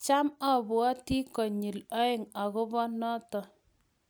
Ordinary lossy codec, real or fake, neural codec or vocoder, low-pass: none; real; none; none